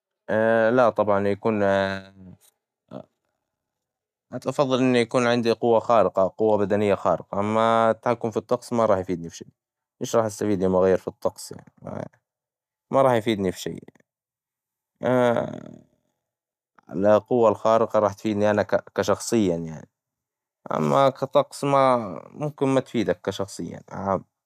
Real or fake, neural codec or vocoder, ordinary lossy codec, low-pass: real; none; none; 14.4 kHz